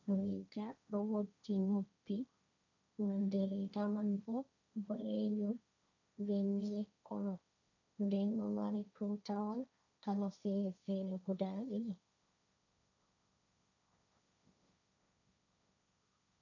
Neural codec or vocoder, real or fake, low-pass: codec, 16 kHz, 1.1 kbps, Voila-Tokenizer; fake; 7.2 kHz